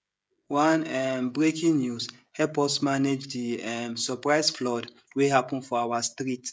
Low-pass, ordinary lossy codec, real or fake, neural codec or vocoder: none; none; fake; codec, 16 kHz, 16 kbps, FreqCodec, smaller model